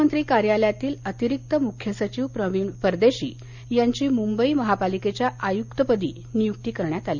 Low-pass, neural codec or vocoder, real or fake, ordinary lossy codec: 7.2 kHz; none; real; Opus, 64 kbps